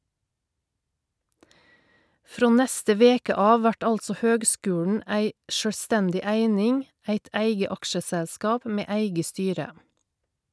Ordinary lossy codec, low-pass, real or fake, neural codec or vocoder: none; none; real; none